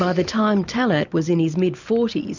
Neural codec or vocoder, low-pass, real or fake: none; 7.2 kHz; real